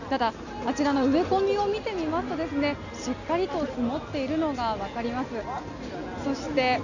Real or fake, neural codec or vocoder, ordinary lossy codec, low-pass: real; none; none; 7.2 kHz